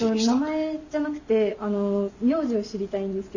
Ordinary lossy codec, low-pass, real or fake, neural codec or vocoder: none; 7.2 kHz; real; none